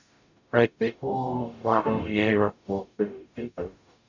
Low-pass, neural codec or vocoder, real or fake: 7.2 kHz; codec, 44.1 kHz, 0.9 kbps, DAC; fake